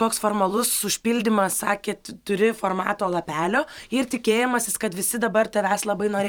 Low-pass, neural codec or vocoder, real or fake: 19.8 kHz; vocoder, 44.1 kHz, 128 mel bands every 512 samples, BigVGAN v2; fake